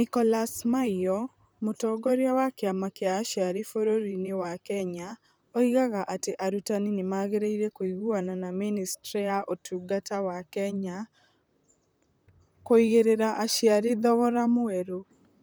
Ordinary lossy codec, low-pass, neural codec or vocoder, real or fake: none; none; vocoder, 44.1 kHz, 128 mel bands, Pupu-Vocoder; fake